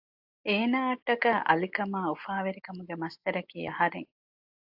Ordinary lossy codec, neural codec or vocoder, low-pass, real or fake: AAC, 48 kbps; none; 5.4 kHz; real